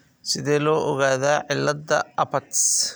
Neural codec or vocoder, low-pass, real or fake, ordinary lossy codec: none; none; real; none